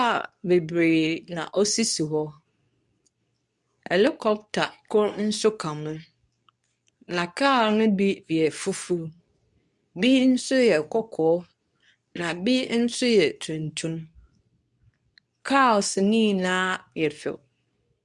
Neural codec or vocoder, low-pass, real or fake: codec, 24 kHz, 0.9 kbps, WavTokenizer, medium speech release version 2; 10.8 kHz; fake